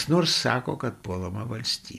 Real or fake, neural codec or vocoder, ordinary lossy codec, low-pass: real; none; MP3, 96 kbps; 14.4 kHz